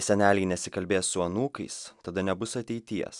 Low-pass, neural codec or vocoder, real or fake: 10.8 kHz; none; real